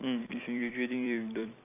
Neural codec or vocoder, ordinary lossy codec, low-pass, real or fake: vocoder, 44.1 kHz, 128 mel bands every 256 samples, BigVGAN v2; none; 3.6 kHz; fake